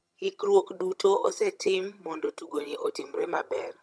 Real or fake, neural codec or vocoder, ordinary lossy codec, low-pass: fake; vocoder, 22.05 kHz, 80 mel bands, HiFi-GAN; none; none